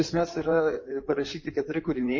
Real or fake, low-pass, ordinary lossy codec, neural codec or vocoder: fake; 7.2 kHz; MP3, 32 kbps; codec, 16 kHz in and 24 kHz out, 2.2 kbps, FireRedTTS-2 codec